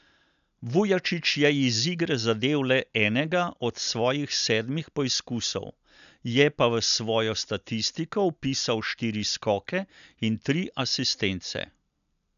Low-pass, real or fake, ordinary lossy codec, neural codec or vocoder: 7.2 kHz; real; none; none